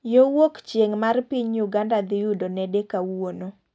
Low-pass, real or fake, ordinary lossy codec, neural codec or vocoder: none; real; none; none